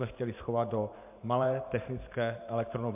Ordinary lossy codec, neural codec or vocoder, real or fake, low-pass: MP3, 32 kbps; vocoder, 44.1 kHz, 128 mel bands every 512 samples, BigVGAN v2; fake; 3.6 kHz